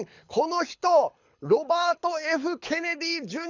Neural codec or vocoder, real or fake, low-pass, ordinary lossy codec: codec, 24 kHz, 6 kbps, HILCodec; fake; 7.2 kHz; none